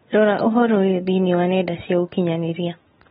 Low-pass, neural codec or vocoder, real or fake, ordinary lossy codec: 19.8 kHz; none; real; AAC, 16 kbps